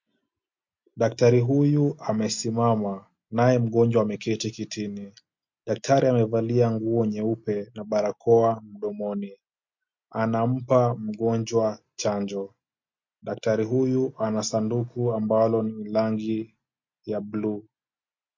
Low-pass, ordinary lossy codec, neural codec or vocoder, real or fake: 7.2 kHz; MP3, 48 kbps; none; real